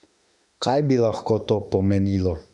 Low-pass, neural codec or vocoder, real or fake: 10.8 kHz; autoencoder, 48 kHz, 32 numbers a frame, DAC-VAE, trained on Japanese speech; fake